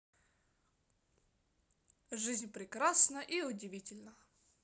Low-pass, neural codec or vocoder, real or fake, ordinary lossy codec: none; none; real; none